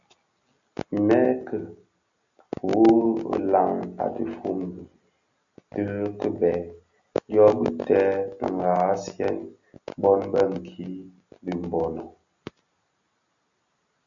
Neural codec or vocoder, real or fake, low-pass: none; real; 7.2 kHz